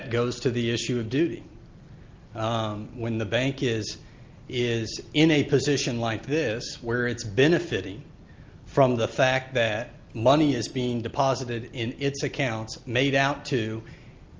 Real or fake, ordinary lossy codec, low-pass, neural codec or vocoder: real; Opus, 24 kbps; 7.2 kHz; none